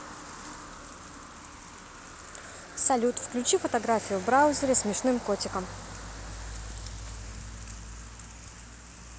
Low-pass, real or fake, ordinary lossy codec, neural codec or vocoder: none; real; none; none